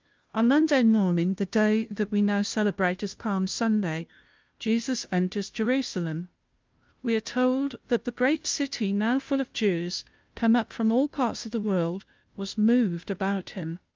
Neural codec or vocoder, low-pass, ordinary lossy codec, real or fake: codec, 16 kHz, 0.5 kbps, FunCodec, trained on Chinese and English, 25 frames a second; 7.2 kHz; Opus, 24 kbps; fake